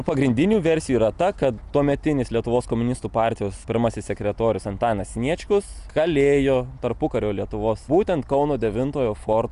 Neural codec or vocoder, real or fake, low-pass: none; real; 10.8 kHz